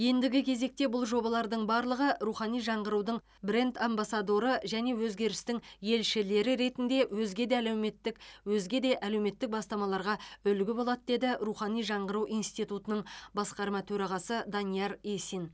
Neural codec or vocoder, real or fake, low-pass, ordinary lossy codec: none; real; none; none